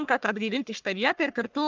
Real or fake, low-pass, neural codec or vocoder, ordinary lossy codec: fake; 7.2 kHz; codec, 44.1 kHz, 1.7 kbps, Pupu-Codec; Opus, 24 kbps